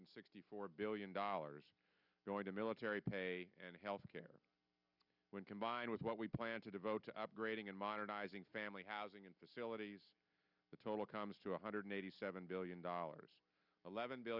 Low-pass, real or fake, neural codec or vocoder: 5.4 kHz; real; none